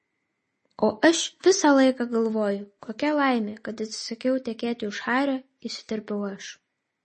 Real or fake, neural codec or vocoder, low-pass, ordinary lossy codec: real; none; 10.8 kHz; MP3, 32 kbps